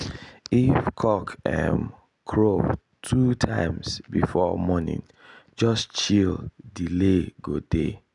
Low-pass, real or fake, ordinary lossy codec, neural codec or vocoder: 10.8 kHz; real; none; none